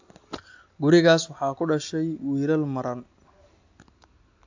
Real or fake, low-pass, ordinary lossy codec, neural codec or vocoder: real; 7.2 kHz; AAC, 48 kbps; none